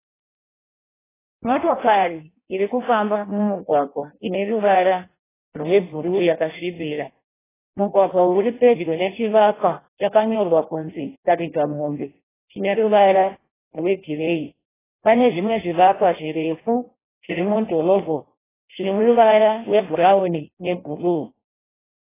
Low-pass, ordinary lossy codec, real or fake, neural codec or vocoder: 3.6 kHz; AAC, 16 kbps; fake; codec, 16 kHz in and 24 kHz out, 0.6 kbps, FireRedTTS-2 codec